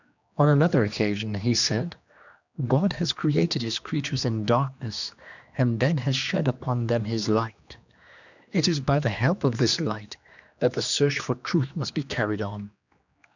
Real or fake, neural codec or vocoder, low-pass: fake; codec, 16 kHz, 2 kbps, X-Codec, HuBERT features, trained on general audio; 7.2 kHz